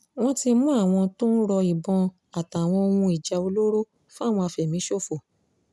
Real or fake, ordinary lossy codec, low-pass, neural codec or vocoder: real; none; none; none